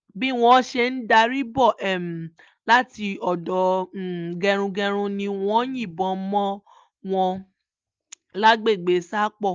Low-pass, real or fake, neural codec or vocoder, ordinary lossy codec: 7.2 kHz; real; none; Opus, 24 kbps